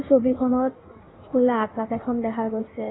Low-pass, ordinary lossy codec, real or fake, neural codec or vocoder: 7.2 kHz; AAC, 16 kbps; fake; codec, 16 kHz in and 24 kHz out, 1.1 kbps, FireRedTTS-2 codec